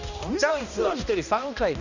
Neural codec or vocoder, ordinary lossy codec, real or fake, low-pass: codec, 16 kHz, 1 kbps, X-Codec, HuBERT features, trained on balanced general audio; none; fake; 7.2 kHz